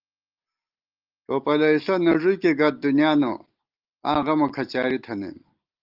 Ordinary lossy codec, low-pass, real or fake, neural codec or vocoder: Opus, 32 kbps; 5.4 kHz; real; none